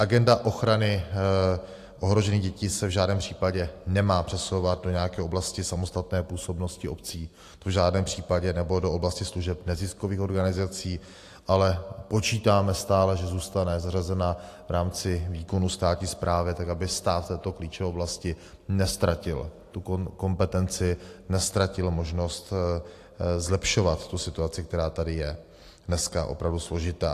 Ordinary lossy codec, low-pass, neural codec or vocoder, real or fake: AAC, 64 kbps; 14.4 kHz; none; real